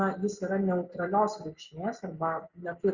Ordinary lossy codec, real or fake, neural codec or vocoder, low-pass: Opus, 64 kbps; real; none; 7.2 kHz